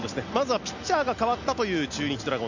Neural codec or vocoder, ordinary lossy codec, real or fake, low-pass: none; none; real; 7.2 kHz